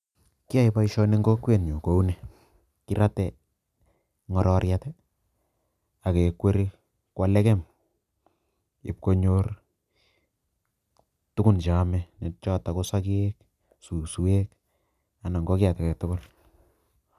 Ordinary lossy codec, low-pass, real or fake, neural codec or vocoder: none; 14.4 kHz; real; none